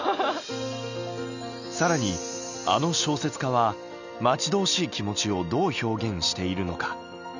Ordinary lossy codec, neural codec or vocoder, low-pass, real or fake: none; none; 7.2 kHz; real